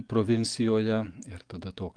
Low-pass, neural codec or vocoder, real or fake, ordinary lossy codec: 9.9 kHz; codec, 44.1 kHz, 7.8 kbps, DAC; fake; Opus, 32 kbps